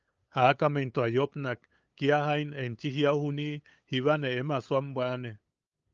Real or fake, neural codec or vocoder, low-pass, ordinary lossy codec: fake; codec, 16 kHz, 8 kbps, FunCodec, trained on LibriTTS, 25 frames a second; 7.2 kHz; Opus, 24 kbps